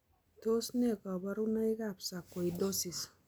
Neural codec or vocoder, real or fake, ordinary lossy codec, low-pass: none; real; none; none